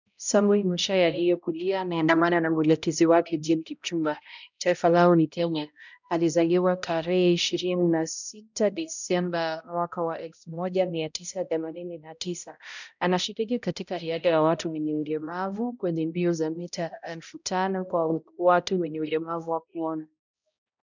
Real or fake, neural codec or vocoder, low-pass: fake; codec, 16 kHz, 0.5 kbps, X-Codec, HuBERT features, trained on balanced general audio; 7.2 kHz